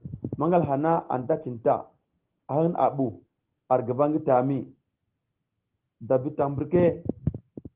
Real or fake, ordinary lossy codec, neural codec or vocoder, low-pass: real; Opus, 16 kbps; none; 3.6 kHz